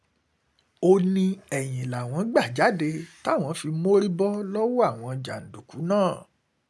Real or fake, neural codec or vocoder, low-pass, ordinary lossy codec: real; none; none; none